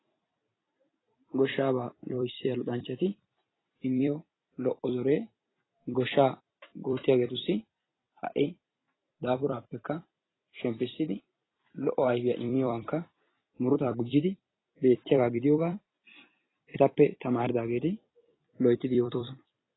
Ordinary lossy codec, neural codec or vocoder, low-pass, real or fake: AAC, 16 kbps; vocoder, 44.1 kHz, 128 mel bands every 256 samples, BigVGAN v2; 7.2 kHz; fake